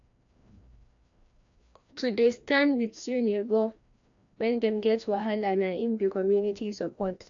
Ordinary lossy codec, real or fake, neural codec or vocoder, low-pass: AAC, 64 kbps; fake; codec, 16 kHz, 1 kbps, FreqCodec, larger model; 7.2 kHz